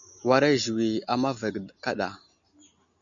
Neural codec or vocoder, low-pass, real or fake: none; 7.2 kHz; real